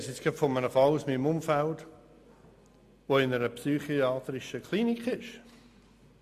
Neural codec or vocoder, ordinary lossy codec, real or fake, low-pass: none; MP3, 64 kbps; real; 14.4 kHz